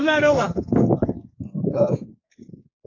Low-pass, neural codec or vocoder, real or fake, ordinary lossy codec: 7.2 kHz; codec, 32 kHz, 1.9 kbps, SNAC; fake; AAC, 48 kbps